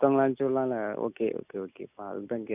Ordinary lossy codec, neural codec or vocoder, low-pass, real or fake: none; none; 3.6 kHz; real